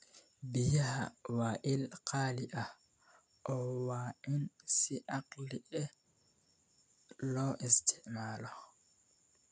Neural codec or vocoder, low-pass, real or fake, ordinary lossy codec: none; none; real; none